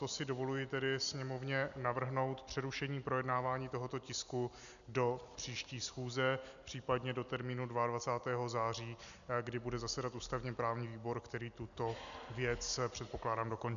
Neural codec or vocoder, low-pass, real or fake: none; 7.2 kHz; real